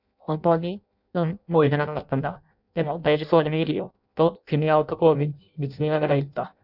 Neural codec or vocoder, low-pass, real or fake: codec, 16 kHz in and 24 kHz out, 0.6 kbps, FireRedTTS-2 codec; 5.4 kHz; fake